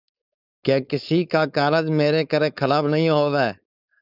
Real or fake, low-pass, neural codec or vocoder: fake; 5.4 kHz; codec, 16 kHz, 4.8 kbps, FACodec